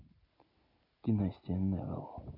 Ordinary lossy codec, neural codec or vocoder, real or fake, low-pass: none; none; real; 5.4 kHz